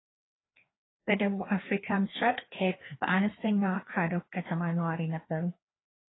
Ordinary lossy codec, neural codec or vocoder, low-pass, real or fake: AAC, 16 kbps; codec, 16 kHz, 2 kbps, FreqCodec, larger model; 7.2 kHz; fake